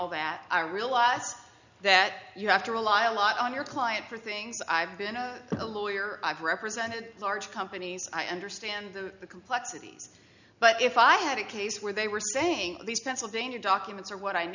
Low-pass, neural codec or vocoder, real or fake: 7.2 kHz; none; real